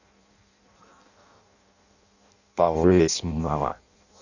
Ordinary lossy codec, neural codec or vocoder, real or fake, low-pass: none; codec, 16 kHz in and 24 kHz out, 0.6 kbps, FireRedTTS-2 codec; fake; 7.2 kHz